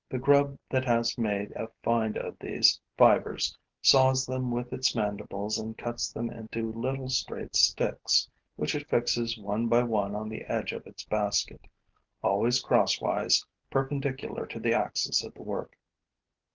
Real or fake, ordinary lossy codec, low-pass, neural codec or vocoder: real; Opus, 16 kbps; 7.2 kHz; none